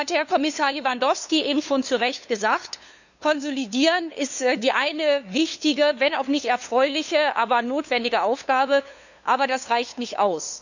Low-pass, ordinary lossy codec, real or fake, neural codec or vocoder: 7.2 kHz; none; fake; codec, 16 kHz, 2 kbps, FunCodec, trained on LibriTTS, 25 frames a second